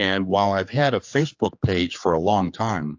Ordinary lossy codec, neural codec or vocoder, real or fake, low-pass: AAC, 48 kbps; codec, 16 kHz, 4 kbps, X-Codec, HuBERT features, trained on general audio; fake; 7.2 kHz